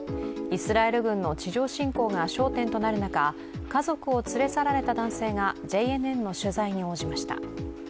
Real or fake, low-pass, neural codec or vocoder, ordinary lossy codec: real; none; none; none